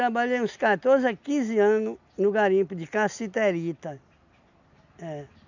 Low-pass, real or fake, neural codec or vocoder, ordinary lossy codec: 7.2 kHz; real; none; none